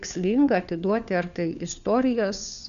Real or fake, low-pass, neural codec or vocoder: fake; 7.2 kHz; codec, 16 kHz, 4 kbps, FunCodec, trained on LibriTTS, 50 frames a second